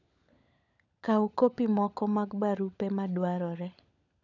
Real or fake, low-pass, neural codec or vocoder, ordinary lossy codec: fake; 7.2 kHz; codec, 16 kHz, 16 kbps, FunCodec, trained on LibriTTS, 50 frames a second; none